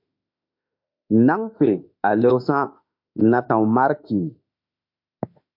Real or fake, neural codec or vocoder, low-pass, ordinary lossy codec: fake; autoencoder, 48 kHz, 32 numbers a frame, DAC-VAE, trained on Japanese speech; 5.4 kHz; MP3, 48 kbps